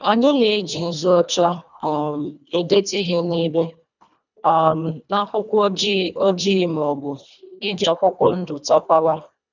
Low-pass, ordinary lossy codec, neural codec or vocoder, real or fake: 7.2 kHz; none; codec, 24 kHz, 1.5 kbps, HILCodec; fake